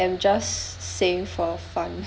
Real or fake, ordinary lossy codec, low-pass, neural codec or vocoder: real; none; none; none